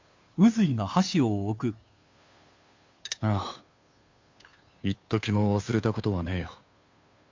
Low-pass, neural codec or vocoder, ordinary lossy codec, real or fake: 7.2 kHz; codec, 16 kHz, 2 kbps, FunCodec, trained on Chinese and English, 25 frames a second; MP3, 64 kbps; fake